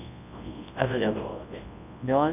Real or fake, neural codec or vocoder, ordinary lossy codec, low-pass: fake; codec, 24 kHz, 0.9 kbps, WavTokenizer, large speech release; none; 3.6 kHz